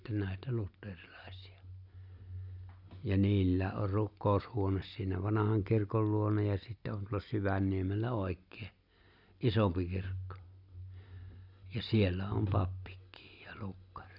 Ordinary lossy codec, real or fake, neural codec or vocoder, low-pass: none; real; none; 5.4 kHz